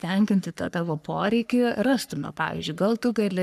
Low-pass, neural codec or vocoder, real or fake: 14.4 kHz; codec, 44.1 kHz, 3.4 kbps, Pupu-Codec; fake